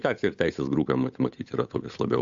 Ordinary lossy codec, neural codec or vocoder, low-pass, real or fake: AAC, 64 kbps; codec, 16 kHz, 8 kbps, FunCodec, trained on Chinese and English, 25 frames a second; 7.2 kHz; fake